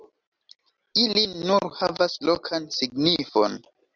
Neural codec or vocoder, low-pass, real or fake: none; 7.2 kHz; real